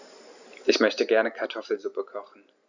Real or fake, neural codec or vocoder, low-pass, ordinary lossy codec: real; none; 7.2 kHz; Opus, 64 kbps